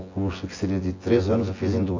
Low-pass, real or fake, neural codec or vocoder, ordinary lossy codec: 7.2 kHz; fake; vocoder, 24 kHz, 100 mel bands, Vocos; AAC, 32 kbps